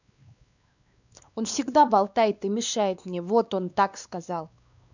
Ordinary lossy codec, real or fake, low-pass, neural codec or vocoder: none; fake; 7.2 kHz; codec, 16 kHz, 2 kbps, X-Codec, WavLM features, trained on Multilingual LibriSpeech